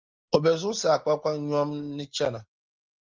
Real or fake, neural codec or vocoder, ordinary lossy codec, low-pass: real; none; Opus, 32 kbps; 7.2 kHz